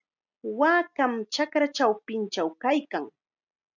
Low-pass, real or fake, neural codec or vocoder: 7.2 kHz; real; none